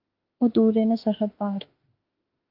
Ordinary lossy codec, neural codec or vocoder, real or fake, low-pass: Opus, 24 kbps; autoencoder, 48 kHz, 32 numbers a frame, DAC-VAE, trained on Japanese speech; fake; 5.4 kHz